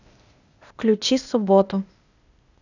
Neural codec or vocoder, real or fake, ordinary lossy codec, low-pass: codec, 16 kHz, 0.8 kbps, ZipCodec; fake; none; 7.2 kHz